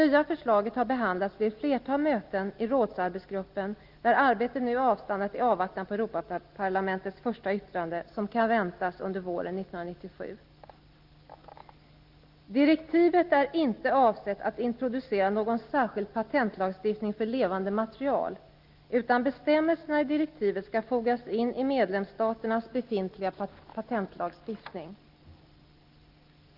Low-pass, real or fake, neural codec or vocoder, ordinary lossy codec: 5.4 kHz; real; none; Opus, 32 kbps